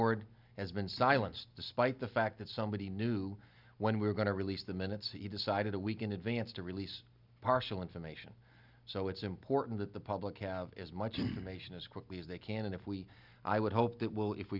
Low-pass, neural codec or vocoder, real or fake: 5.4 kHz; none; real